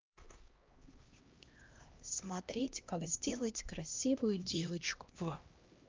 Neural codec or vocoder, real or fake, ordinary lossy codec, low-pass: codec, 16 kHz, 1 kbps, X-Codec, HuBERT features, trained on LibriSpeech; fake; Opus, 24 kbps; 7.2 kHz